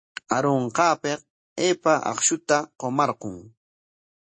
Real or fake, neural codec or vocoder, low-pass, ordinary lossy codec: real; none; 9.9 kHz; MP3, 32 kbps